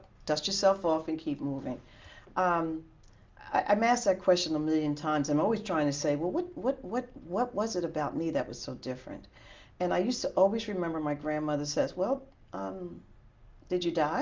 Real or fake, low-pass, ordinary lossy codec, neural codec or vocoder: real; 7.2 kHz; Opus, 32 kbps; none